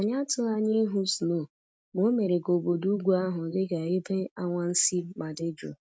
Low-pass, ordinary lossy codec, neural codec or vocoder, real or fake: none; none; none; real